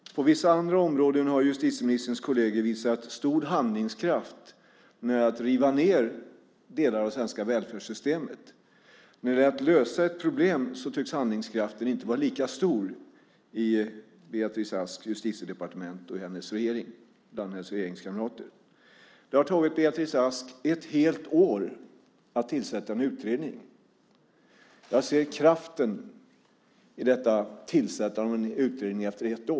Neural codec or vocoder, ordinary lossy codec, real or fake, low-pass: none; none; real; none